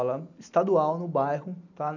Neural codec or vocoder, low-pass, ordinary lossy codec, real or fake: none; 7.2 kHz; none; real